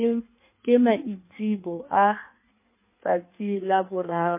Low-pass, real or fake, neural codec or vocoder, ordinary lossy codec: 3.6 kHz; fake; codec, 16 kHz in and 24 kHz out, 1.1 kbps, FireRedTTS-2 codec; MP3, 24 kbps